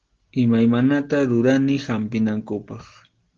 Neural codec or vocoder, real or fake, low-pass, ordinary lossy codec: none; real; 7.2 kHz; Opus, 16 kbps